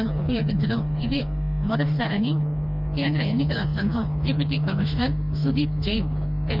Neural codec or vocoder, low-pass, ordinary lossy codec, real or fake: codec, 16 kHz, 2 kbps, FreqCodec, smaller model; 5.4 kHz; none; fake